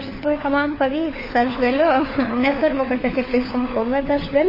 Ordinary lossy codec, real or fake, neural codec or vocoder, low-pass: MP3, 24 kbps; fake; codec, 16 kHz, 4 kbps, FunCodec, trained on LibriTTS, 50 frames a second; 5.4 kHz